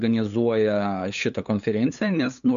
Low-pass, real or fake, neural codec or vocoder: 7.2 kHz; fake; codec, 16 kHz, 8 kbps, FunCodec, trained on LibriTTS, 25 frames a second